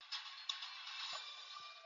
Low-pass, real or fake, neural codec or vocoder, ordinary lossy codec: 7.2 kHz; real; none; AAC, 64 kbps